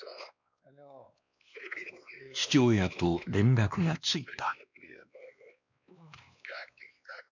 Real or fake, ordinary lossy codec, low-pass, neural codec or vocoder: fake; none; 7.2 kHz; codec, 16 kHz, 2 kbps, X-Codec, WavLM features, trained on Multilingual LibriSpeech